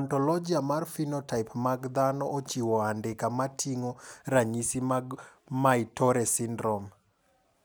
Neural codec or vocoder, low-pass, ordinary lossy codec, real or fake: none; none; none; real